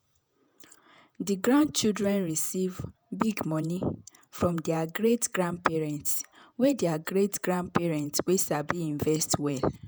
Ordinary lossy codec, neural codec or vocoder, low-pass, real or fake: none; vocoder, 48 kHz, 128 mel bands, Vocos; none; fake